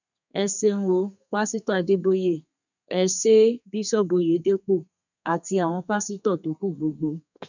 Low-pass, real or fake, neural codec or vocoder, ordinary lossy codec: 7.2 kHz; fake; codec, 32 kHz, 1.9 kbps, SNAC; none